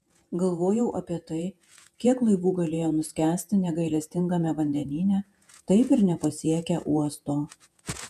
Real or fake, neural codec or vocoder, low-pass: fake; vocoder, 48 kHz, 128 mel bands, Vocos; 14.4 kHz